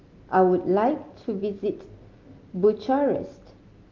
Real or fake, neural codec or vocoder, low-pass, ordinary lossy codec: real; none; 7.2 kHz; Opus, 24 kbps